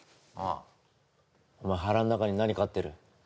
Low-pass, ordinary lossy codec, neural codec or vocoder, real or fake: none; none; none; real